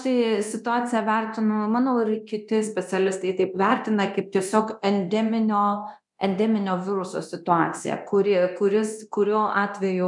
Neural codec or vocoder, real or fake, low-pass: codec, 24 kHz, 0.9 kbps, DualCodec; fake; 10.8 kHz